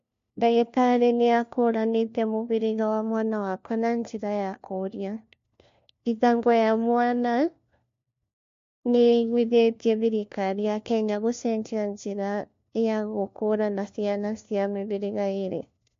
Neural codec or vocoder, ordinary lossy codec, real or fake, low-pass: codec, 16 kHz, 1 kbps, FunCodec, trained on LibriTTS, 50 frames a second; MP3, 48 kbps; fake; 7.2 kHz